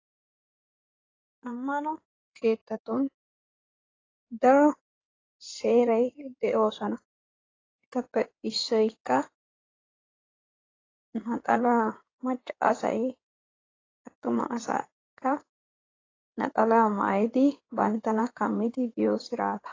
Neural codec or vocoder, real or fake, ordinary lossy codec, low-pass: codec, 16 kHz in and 24 kHz out, 2.2 kbps, FireRedTTS-2 codec; fake; AAC, 32 kbps; 7.2 kHz